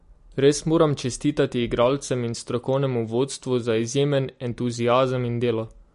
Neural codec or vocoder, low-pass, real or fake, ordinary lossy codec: none; 10.8 kHz; real; MP3, 48 kbps